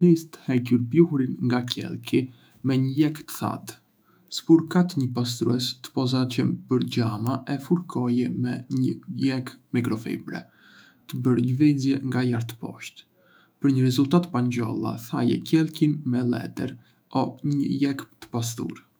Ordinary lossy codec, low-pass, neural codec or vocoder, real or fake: none; none; autoencoder, 48 kHz, 128 numbers a frame, DAC-VAE, trained on Japanese speech; fake